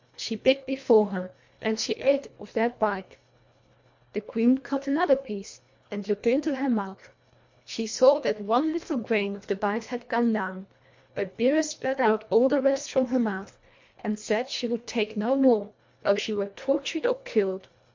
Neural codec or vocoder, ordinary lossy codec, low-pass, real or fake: codec, 24 kHz, 1.5 kbps, HILCodec; MP3, 48 kbps; 7.2 kHz; fake